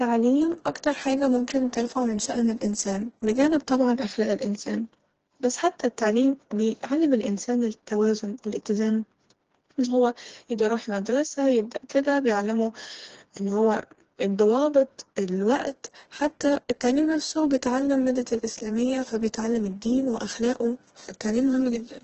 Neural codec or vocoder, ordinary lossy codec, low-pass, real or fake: codec, 16 kHz, 2 kbps, FreqCodec, smaller model; Opus, 16 kbps; 7.2 kHz; fake